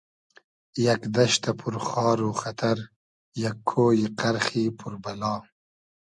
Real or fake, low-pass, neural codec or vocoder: real; 10.8 kHz; none